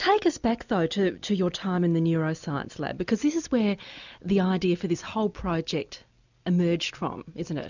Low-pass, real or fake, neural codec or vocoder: 7.2 kHz; real; none